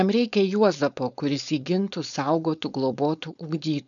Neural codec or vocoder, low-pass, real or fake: codec, 16 kHz, 4.8 kbps, FACodec; 7.2 kHz; fake